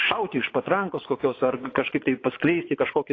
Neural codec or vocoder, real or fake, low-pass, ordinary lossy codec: vocoder, 24 kHz, 100 mel bands, Vocos; fake; 7.2 kHz; AAC, 32 kbps